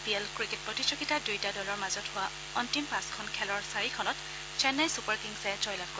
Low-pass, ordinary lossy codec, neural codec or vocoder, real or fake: none; none; none; real